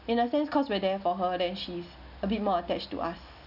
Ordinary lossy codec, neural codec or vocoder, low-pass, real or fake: none; none; 5.4 kHz; real